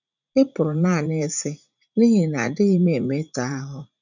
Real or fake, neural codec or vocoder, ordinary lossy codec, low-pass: fake; vocoder, 44.1 kHz, 80 mel bands, Vocos; none; 7.2 kHz